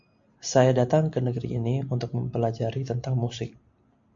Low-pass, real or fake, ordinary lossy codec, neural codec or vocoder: 7.2 kHz; real; MP3, 48 kbps; none